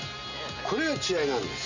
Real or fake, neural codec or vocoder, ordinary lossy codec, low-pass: real; none; none; 7.2 kHz